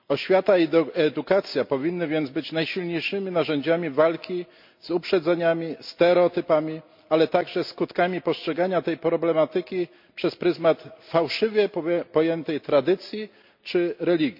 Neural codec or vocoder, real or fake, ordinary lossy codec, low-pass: none; real; MP3, 48 kbps; 5.4 kHz